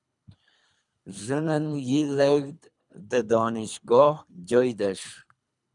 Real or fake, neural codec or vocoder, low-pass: fake; codec, 24 kHz, 3 kbps, HILCodec; 10.8 kHz